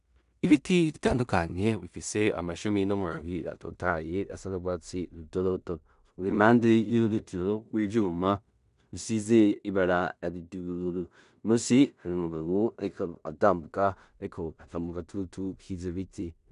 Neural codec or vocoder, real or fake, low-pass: codec, 16 kHz in and 24 kHz out, 0.4 kbps, LongCat-Audio-Codec, two codebook decoder; fake; 10.8 kHz